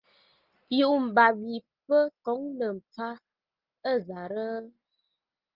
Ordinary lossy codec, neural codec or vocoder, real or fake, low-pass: Opus, 24 kbps; none; real; 5.4 kHz